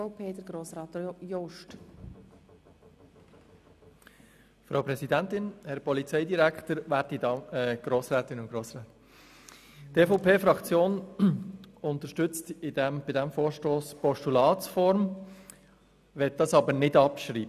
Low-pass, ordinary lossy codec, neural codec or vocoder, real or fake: 14.4 kHz; none; none; real